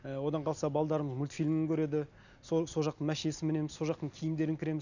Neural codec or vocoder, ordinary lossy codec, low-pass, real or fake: none; none; 7.2 kHz; real